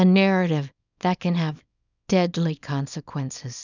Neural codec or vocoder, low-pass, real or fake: codec, 24 kHz, 0.9 kbps, WavTokenizer, small release; 7.2 kHz; fake